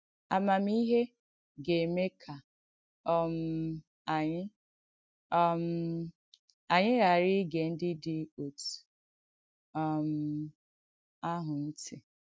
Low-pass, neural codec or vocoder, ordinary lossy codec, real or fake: none; none; none; real